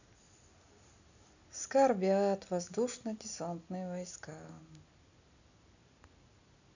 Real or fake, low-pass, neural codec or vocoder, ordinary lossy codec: real; 7.2 kHz; none; none